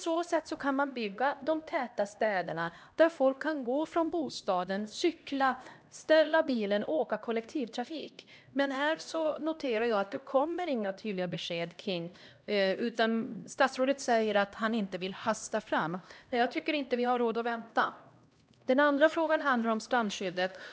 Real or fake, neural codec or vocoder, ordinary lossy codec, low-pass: fake; codec, 16 kHz, 1 kbps, X-Codec, HuBERT features, trained on LibriSpeech; none; none